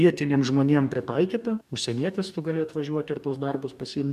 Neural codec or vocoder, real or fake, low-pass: codec, 44.1 kHz, 2.6 kbps, DAC; fake; 14.4 kHz